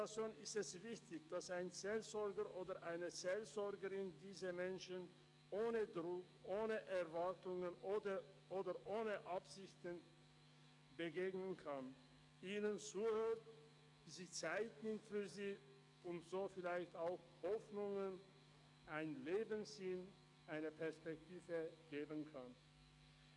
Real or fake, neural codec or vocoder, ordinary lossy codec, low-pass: fake; codec, 44.1 kHz, 7.8 kbps, DAC; none; 10.8 kHz